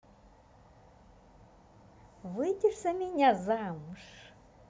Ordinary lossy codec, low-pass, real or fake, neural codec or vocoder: none; none; real; none